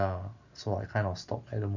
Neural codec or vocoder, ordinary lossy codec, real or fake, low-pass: none; none; real; 7.2 kHz